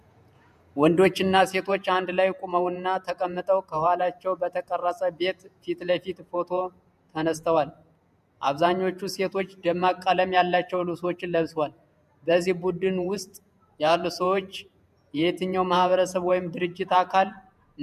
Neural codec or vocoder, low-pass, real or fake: vocoder, 48 kHz, 128 mel bands, Vocos; 14.4 kHz; fake